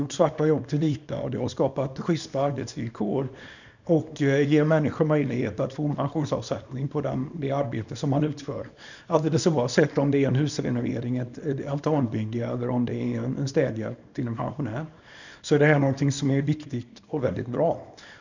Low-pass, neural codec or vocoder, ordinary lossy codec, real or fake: 7.2 kHz; codec, 24 kHz, 0.9 kbps, WavTokenizer, small release; none; fake